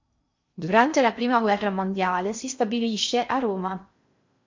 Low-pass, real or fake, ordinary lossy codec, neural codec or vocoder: 7.2 kHz; fake; MP3, 48 kbps; codec, 16 kHz in and 24 kHz out, 0.6 kbps, FocalCodec, streaming, 2048 codes